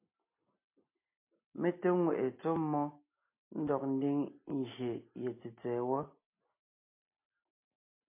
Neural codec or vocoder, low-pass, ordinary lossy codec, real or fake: none; 3.6 kHz; MP3, 24 kbps; real